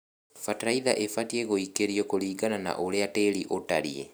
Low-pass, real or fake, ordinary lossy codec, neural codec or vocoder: none; real; none; none